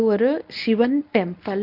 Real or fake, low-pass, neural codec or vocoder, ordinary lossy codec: fake; 5.4 kHz; codec, 24 kHz, 0.9 kbps, WavTokenizer, medium speech release version 1; none